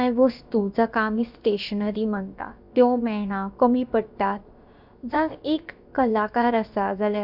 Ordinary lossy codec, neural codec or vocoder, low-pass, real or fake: Opus, 64 kbps; codec, 16 kHz, about 1 kbps, DyCAST, with the encoder's durations; 5.4 kHz; fake